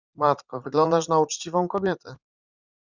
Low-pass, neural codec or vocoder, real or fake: 7.2 kHz; vocoder, 44.1 kHz, 80 mel bands, Vocos; fake